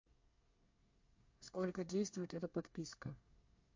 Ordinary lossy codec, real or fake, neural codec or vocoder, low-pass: MP3, 48 kbps; fake; codec, 24 kHz, 1 kbps, SNAC; 7.2 kHz